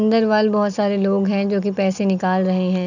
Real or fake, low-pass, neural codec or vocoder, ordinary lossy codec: real; 7.2 kHz; none; none